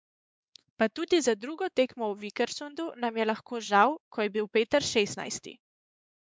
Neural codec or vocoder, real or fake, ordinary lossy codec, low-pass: codec, 16 kHz, 4 kbps, X-Codec, WavLM features, trained on Multilingual LibriSpeech; fake; none; none